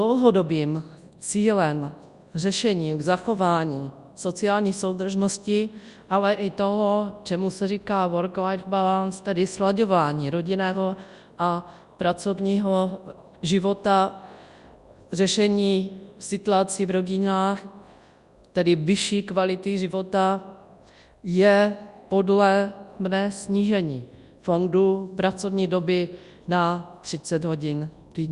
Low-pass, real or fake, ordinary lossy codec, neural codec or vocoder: 10.8 kHz; fake; Opus, 64 kbps; codec, 24 kHz, 0.9 kbps, WavTokenizer, large speech release